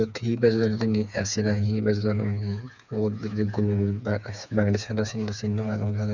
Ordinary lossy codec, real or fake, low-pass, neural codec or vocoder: none; fake; 7.2 kHz; codec, 16 kHz, 4 kbps, FreqCodec, smaller model